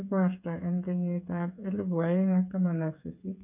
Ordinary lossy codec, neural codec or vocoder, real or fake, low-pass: none; codec, 16 kHz, 8 kbps, FreqCodec, smaller model; fake; 3.6 kHz